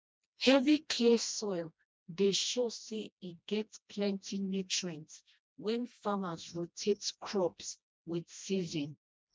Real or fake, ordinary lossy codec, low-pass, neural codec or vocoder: fake; none; none; codec, 16 kHz, 1 kbps, FreqCodec, smaller model